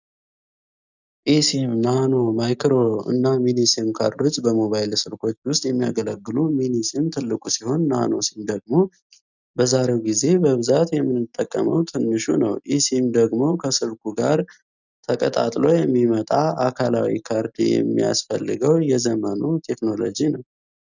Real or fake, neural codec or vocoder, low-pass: real; none; 7.2 kHz